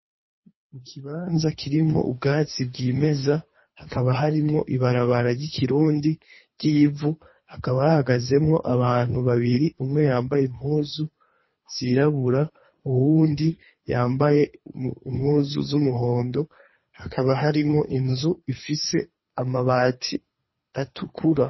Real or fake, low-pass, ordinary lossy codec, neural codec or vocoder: fake; 7.2 kHz; MP3, 24 kbps; codec, 24 kHz, 3 kbps, HILCodec